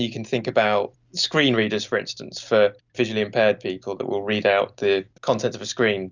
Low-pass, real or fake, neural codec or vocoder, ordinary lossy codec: 7.2 kHz; real; none; Opus, 64 kbps